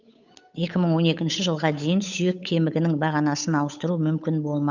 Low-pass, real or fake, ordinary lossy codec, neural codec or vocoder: 7.2 kHz; fake; none; codec, 16 kHz, 8 kbps, FunCodec, trained on Chinese and English, 25 frames a second